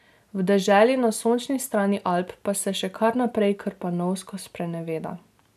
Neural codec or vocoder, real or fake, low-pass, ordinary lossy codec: none; real; 14.4 kHz; none